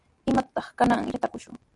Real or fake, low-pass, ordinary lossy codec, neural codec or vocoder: real; 10.8 kHz; MP3, 96 kbps; none